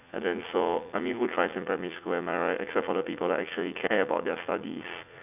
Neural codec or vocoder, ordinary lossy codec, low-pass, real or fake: vocoder, 44.1 kHz, 80 mel bands, Vocos; none; 3.6 kHz; fake